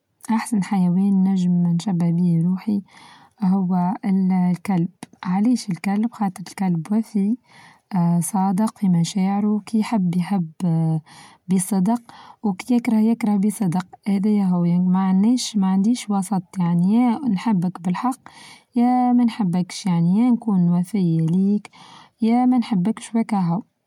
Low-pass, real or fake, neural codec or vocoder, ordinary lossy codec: 19.8 kHz; real; none; MP3, 96 kbps